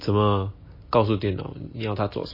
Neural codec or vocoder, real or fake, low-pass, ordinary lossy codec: none; real; 5.4 kHz; MP3, 24 kbps